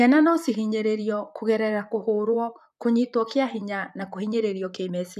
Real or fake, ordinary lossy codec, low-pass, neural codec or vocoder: fake; none; 14.4 kHz; vocoder, 44.1 kHz, 128 mel bands, Pupu-Vocoder